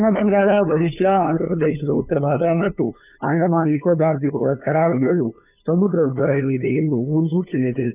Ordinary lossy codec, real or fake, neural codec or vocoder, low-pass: none; fake; codec, 16 kHz, 2 kbps, FunCodec, trained on LibriTTS, 25 frames a second; 3.6 kHz